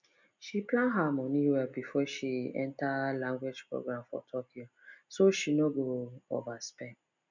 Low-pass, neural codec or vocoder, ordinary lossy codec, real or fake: 7.2 kHz; none; none; real